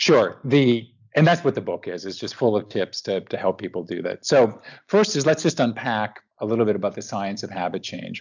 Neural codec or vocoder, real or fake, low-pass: none; real; 7.2 kHz